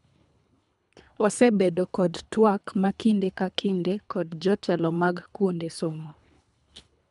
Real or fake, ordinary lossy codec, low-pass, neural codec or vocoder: fake; none; 10.8 kHz; codec, 24 kHz, 3 kbps, HILCodec